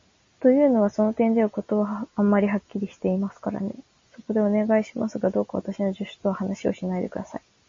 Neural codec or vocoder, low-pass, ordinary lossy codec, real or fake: none; 7.2 kHz; MP3, 32 kbps; real